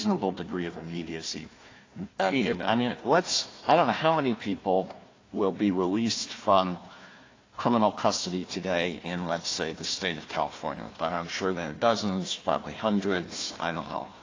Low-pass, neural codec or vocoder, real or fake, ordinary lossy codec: 7.2 kHz; codec, 16 kHz, 1 kbps, FunCodec, trained on Chinese and English, 50 frames a second; fake; AAC, 32 kbps